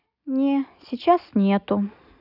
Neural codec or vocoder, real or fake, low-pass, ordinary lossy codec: none; real; 5.4 kHz; none